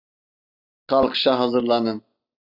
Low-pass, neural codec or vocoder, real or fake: 5.4 kHz; none; real